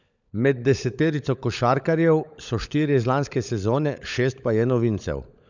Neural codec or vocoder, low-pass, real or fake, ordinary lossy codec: codec, 16 kHz, 16 kbps, FunCodec, trained on LibriTTS, 50 frames a second; 7.2 kHz; fake; none